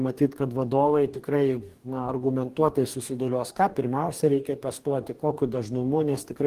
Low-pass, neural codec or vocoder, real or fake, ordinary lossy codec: 14.4 kHz; codec, 44.1 kHz, 2.6 kbps, DAC; fake; Opus, 24 kbps